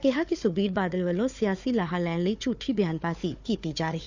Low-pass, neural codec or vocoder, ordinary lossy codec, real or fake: 7.2 kHz; codec, 16 kHz, 4 kbps, FunCodec, trained on Chinese and English, 50 frames a second; none; fake